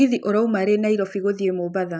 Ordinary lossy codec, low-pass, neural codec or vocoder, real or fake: none; none; none; real